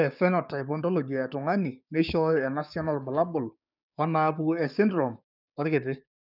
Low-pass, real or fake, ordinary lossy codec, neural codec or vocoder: 5.4 kHz; fake; none; codec, 16 kHz, 6 kbps, DAC